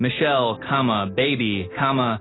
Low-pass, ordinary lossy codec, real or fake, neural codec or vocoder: 7.2 kHz; AAC, 16 kbps; real; none